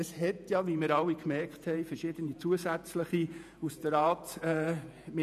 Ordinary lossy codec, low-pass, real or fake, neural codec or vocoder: none; 14.4 kHz; fake; vocoder, 48 kHz, 128 mel bands, Vocos